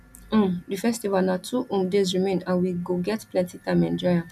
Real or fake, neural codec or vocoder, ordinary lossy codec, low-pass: real; none; none; 14.4 kHz